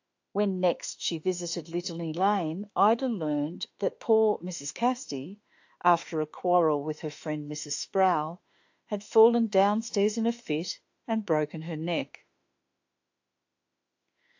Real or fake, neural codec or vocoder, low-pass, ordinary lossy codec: fake; autoencoder, 48 kHz, 32 numbers a frame, DAC-VAE, trained on Japanese speech; 7.2 kHz; AAC, 48 kbps